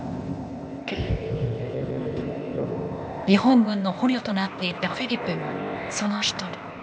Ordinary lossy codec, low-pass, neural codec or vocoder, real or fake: none; none; codec, 16 kHz, 0.8 kbps, ZipCodec; fake